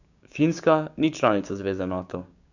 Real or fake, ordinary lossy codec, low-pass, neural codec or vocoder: fake; none; 7.2 kHz; codec, 16 kHz, 6 kbps, DAC